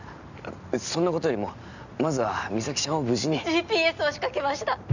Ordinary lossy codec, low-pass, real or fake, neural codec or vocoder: none; 7.2 kHz; real; none